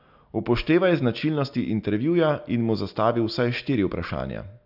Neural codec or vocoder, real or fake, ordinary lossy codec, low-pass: none; real; none; 5.4 kHz